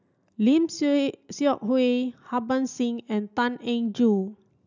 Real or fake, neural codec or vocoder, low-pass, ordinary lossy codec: real; none; 7.2 kHz; none